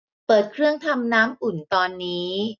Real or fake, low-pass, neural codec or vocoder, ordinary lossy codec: real; 7.2 kHz; none; none